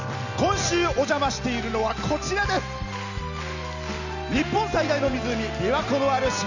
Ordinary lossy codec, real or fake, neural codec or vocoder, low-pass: none; real; none; 7.2 kHz